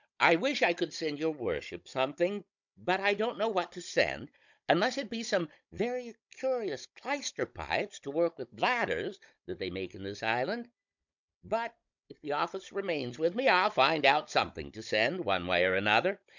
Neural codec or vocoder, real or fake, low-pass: codec, 16 kHz, 16 kbps, FunCodec, trained on Chinese and English, 50 frames a second; fake; 7.2 kHz